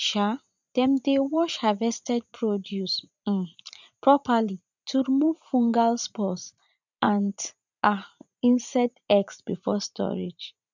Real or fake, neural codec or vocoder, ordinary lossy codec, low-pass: fake; vocoder, 44.1 kHz, 80 mel bands, Vocos; none; 7.2 kHz